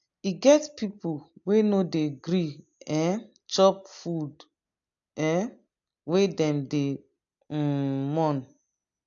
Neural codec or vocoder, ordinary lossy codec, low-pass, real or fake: none; AAC, 64 kbps; 7.2 kHz; real